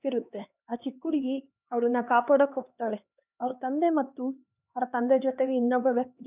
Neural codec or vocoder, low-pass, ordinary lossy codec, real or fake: codec, 16 kHz, 2 kbps, X-Codec, HuBERT features, trained on LibriSpeech; 3.6 kHz; none; fake